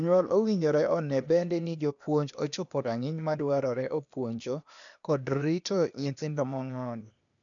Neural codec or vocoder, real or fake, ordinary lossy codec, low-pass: codec, 16 kHz, 0.8 kbps, ZipCodec; fake; none; 7.2 kHz